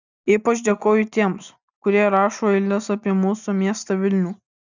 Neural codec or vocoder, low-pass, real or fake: none; 7.2 kHz; real